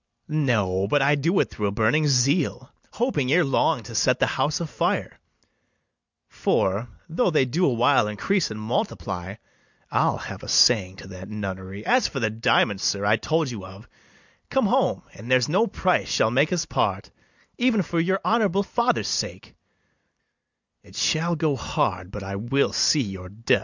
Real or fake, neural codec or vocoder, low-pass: real; none; 7.2 kHz